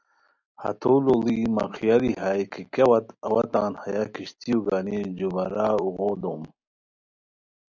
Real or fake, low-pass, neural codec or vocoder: real; 7.2 kHz; none